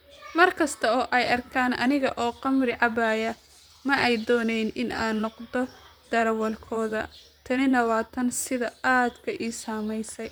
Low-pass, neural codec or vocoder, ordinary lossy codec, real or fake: none; vocoder, 44.1 kHz, 128 mel bands, Pupu-Vocoder; none; fake